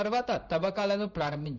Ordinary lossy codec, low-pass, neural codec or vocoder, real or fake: none; 7.2 kHz; codec, 16 kHz in and 24 kHz out, 1 kbps, XY-Tokenizer; fake